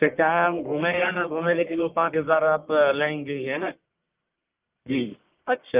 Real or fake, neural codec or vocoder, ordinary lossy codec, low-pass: fake; codec, 44.1 kHz, 1.7 kbps, Pupu-Codec; Opus, 24 kbps; 3.6 kHz